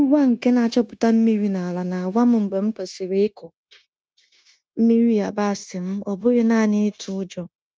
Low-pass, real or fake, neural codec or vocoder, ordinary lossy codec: none; fake; codec, 16 kHz, 0.9 kbps, LongCat-Audio-Codec; none